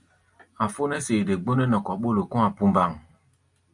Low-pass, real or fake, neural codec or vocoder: 10.8 kHz; real; none